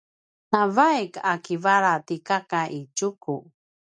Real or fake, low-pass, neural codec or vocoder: real; 9.9 kHz; none